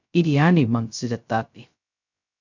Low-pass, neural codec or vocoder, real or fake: 7.2 kHz; codec, 16 kHz, 0.2 kbps, FocalCodec; fake